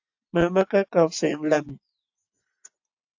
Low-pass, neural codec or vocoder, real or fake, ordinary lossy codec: 7.2 kHz; vocoder, 24 kHz, 100 mel bands, Vocos; fake; AAC, 48 kbps